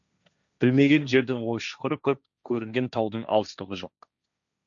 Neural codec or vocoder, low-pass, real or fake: codec, 16 kHz, 1.1 kbps, Voila-Tokenizer; 7.2 kHz; fake